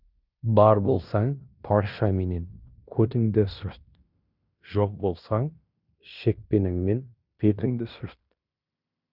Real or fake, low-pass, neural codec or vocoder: fake; 5.4 kHz; codec, 16 kHz in and 24 kHz out, 0.9 kbps, LongCat-Audio-Codec, fine tuned four codebook decoder